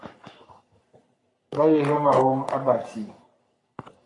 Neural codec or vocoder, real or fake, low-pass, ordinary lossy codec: codec, 44.1 kHz, 3.4 kbps, Pupu-Codec; fake; 10.8 kHz; MP3, 48 kbps